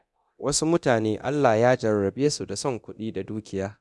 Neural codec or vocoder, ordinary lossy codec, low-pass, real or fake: codec, 24 kHz, 0.9 kbps, DualCodec; none; none; fake